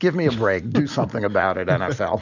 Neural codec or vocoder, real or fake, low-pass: none; real; 7.2 kHz